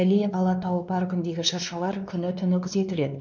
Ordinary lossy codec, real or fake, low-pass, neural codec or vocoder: none; fake; 7.2 kHz; codec, 16 kHz, 2 kbps, X-Codec, WavLM features, trained on Multilingual LibriSpeech